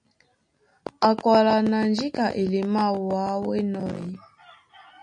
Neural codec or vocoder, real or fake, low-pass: none; real; 9.9 kHz